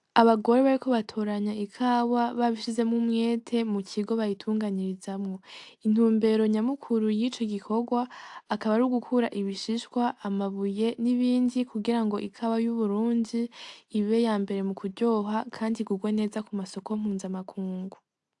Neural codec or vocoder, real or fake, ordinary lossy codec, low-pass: none; real; AAC, 64 kbps; 10.8 kHz